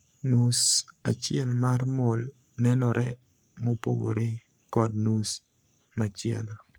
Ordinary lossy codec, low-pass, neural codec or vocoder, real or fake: none; none; codec, 44.1 kHz, 3.4 kbps, Pupu-Codec; fake